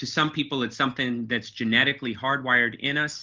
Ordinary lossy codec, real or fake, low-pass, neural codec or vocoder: Opus, 32 kbps; real; 7.2 kHz; none